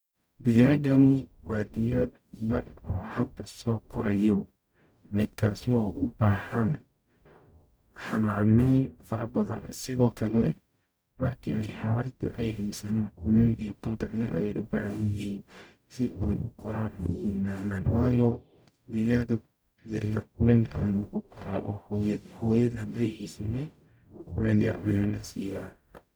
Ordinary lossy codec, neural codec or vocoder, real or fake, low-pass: none; codec, 44.1 kHz, 0.9 kbps, DAC; fake; none